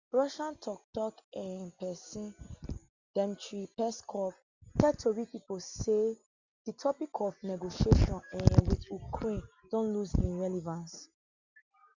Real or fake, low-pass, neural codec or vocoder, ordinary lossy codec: real; 7.2 kHz; none; Opus, 64 kbps